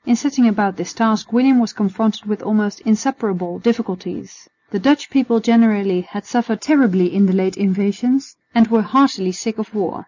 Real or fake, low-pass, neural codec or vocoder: real; 7.2 kHz; none